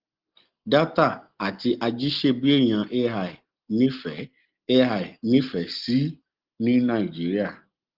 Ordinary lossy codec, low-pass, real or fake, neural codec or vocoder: Opus, 16 kbps; 5.4 kHz; real; none